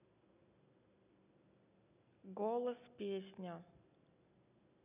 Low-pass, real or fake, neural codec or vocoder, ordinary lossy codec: 3.6 kHz; real; none; none